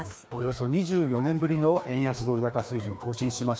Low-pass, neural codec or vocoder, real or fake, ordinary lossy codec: none; codec, 16 kHz, 2 kbps, FreqCodec, larger model; fake; none